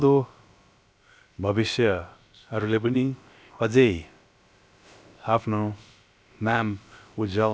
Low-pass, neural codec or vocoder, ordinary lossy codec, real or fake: none; codec, 16 kHz, about 1 kbps, DyCAST, with the encoder's durations; none; fake